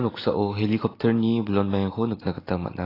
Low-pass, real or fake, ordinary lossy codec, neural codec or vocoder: 5.4 kHz; real; AAC, 24 kbps; none